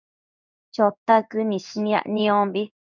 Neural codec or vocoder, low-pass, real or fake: codec, 16 kHz in and 24 kHz out, 1 kbps, XY-Tokenizer; 7.2 kHz; fake